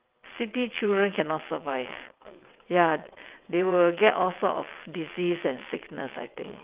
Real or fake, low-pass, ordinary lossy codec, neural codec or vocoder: fake; 3.6 kHz; Opus, 32 kbps; vocoder, 22.05 kHz, 80 mel bands, WaveNeXt